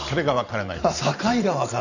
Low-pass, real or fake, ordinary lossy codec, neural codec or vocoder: 7.2 kHz; fake; none; vocoder, 44.1 kHz, 128 mel bands every 512 samples, BigVGAN v2